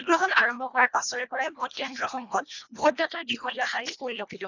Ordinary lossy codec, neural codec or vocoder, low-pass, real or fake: none; codec, 24 kHz, 1.5 kbps, HILCodec; 7.2 kHz; fake